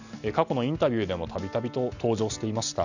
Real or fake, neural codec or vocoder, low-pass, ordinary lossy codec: real; none; 7.2 kHz; none